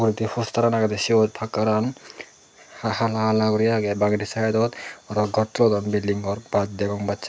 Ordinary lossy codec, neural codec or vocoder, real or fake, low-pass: none; none; real; none